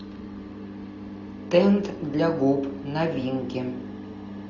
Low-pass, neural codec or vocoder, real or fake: 7.2 kHz; none; real